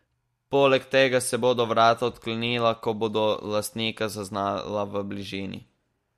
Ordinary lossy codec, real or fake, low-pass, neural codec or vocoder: MP3, 64 kbps; real; 14.4 kHz; none